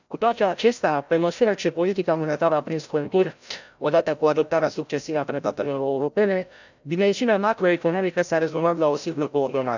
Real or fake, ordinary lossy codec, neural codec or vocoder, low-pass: fake; none; codec, 16 kHz, 0.5 kbps, FreqCodec, larger model; 7.2 kHz